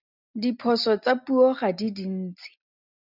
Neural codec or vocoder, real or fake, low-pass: none; real; 5.4 kHz